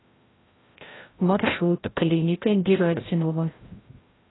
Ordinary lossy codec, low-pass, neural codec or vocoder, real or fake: AAC, 16 kbps; 7.2 kHz; codec, 16 kHz, 0.5 kbps, FreqCodec, larger model; fake